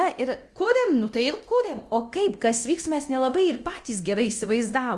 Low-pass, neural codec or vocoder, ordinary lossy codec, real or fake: 10.8 kHz; codec, 24 kHz, 0.9 kbps, DualCodec; Opus, 32 kbps; fake